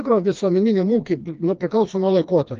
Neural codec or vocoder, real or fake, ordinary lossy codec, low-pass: codec, 16 kHz, 2 kbps, FreqCodec, smaller model; fake; Opus, 24 kbps; 7.2 kHz